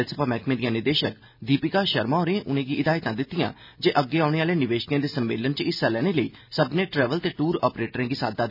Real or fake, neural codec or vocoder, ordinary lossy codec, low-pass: real; none; none; 5.4 kHz